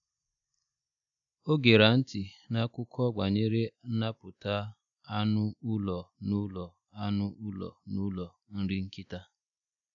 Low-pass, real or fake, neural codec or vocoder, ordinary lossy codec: 7.2 kHz; real; none; none